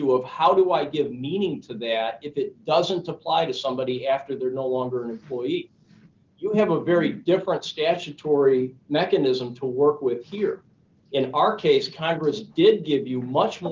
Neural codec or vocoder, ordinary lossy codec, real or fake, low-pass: none; Opus, 32 kbps; real; 7.2 kHz